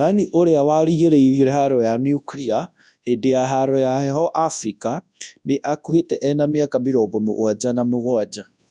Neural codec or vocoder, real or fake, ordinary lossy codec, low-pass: codec, 24 kHz, 0.9 kbps, WavTokenizer, large speech release; fake; none; 10.8 kHz